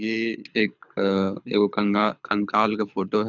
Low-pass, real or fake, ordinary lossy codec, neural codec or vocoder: 7.2 kHz; fake; none; codec, 24 kHz, 6 kbps, HILCodec